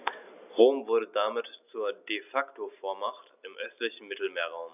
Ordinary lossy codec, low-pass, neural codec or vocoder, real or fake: none; 3.6 kHz; none; real